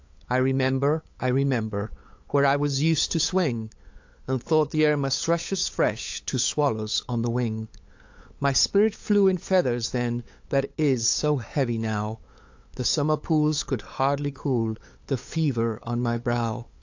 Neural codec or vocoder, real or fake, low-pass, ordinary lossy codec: codec, 16 kHz, 8 kbps, FunCodec, trained on LibriTTS, 25 frames a second; fake; 7.2 kHz; AAC, 48 kbps